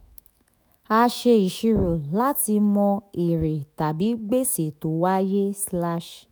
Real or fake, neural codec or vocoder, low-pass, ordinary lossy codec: fake; autoencoder, 48 kHz, 128 numbers a frame, DAC-VAE, trained on Japanese speech; none; none